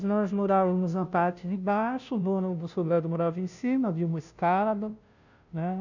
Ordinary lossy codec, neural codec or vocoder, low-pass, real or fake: none; codec, 16 kHz, 0.5 kbps, FunCodec, trained on Chinese and English, 25 frames a second; 7.2 kHz; fake